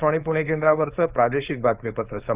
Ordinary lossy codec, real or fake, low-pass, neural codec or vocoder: Opus, 24 kbps; fake; 3.6 kHz; codec, 16 kHz, 4.8 kbps, FACodec